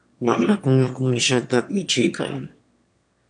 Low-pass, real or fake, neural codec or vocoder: 9.9 kHz; fake; autoencoder, 22.05 kHz, a latent of 192 numbers a frame, VITS, trained on one speaker